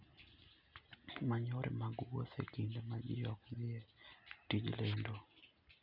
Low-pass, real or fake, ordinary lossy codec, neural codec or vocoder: 5.4 kHz; real; none; none